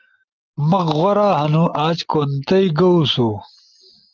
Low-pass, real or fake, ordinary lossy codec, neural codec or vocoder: 7.2 kHz; real; Opus, 24 kbps; none